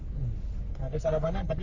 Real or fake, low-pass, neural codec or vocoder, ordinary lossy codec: fake; 7.2 kHz; codec, 44.1 kHz, 3.4 kbps, Pupu-Codec; none